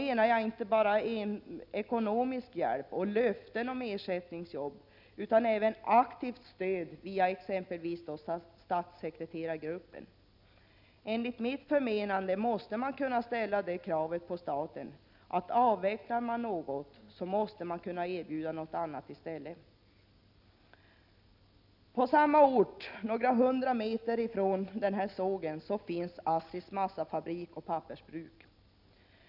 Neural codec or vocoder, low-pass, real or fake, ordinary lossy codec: none; 5.4 kHz; real; none